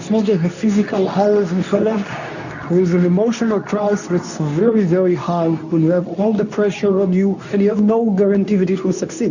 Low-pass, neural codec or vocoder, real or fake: 7.2 kHz; codec, 24 kHz, 0.9 kbps, WavTokenizer, medium speech release version 2; fake